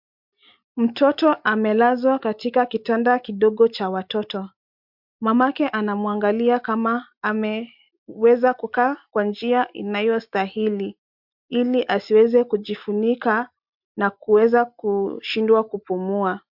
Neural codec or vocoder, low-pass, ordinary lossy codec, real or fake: none; 5.4 kHz; MP3, 48 kbps; real